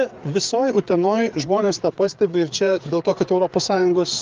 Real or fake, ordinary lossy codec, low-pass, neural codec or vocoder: fake; Opus, 24 kbps; 7.2 kHz; codec, 16 kHz, 4 kbps, FreqCodec, larger model